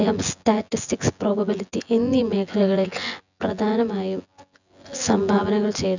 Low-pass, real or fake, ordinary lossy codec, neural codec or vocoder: 7.2 kHz; fake; none; vocoder, 24 kHz, 100 mel bands, Vocos